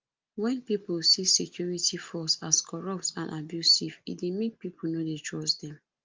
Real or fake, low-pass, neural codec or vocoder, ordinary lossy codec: real; 7.2 kHz; none; Opus, 24 kbps